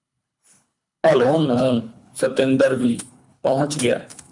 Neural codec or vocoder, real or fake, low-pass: codec, 24 kHz, 3 kbps, HILCodec; fake; 10.8 kHz